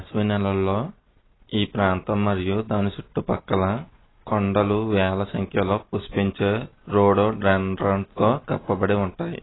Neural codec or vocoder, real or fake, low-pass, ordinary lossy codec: none; real; 7.2 kHz; AAC, 16 kbps